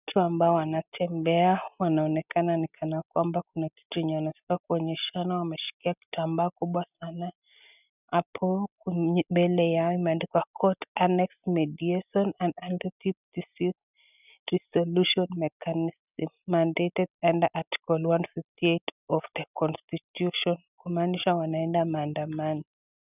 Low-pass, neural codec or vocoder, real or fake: 3.6 kHz; none; real